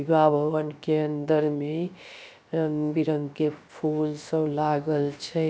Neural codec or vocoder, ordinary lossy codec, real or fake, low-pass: codec, 16 kHz, about 1 kbps, DyCAST, with the encoder's durations; none; fake; none